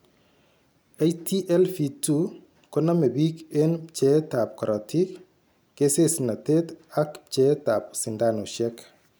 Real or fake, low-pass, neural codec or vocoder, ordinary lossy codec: real; none; none; none